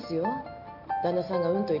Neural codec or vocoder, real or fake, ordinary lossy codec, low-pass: none; real; none; 5.4 kHz